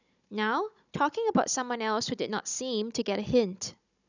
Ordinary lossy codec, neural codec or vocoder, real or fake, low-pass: none; codec, 16 kHz, 16 kbps, FunCodec, trained on Chinese and English, 50 frames a second; fake; 7.2 kHz